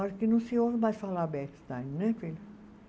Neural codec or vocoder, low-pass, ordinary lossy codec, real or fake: none; none; none; real